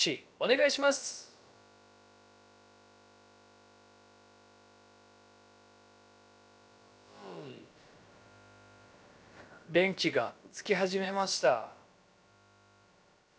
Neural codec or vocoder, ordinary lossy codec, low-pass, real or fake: codec, 16 kHz, about 1 kbps, DyCAST, with the encoder's durations; none; none; fake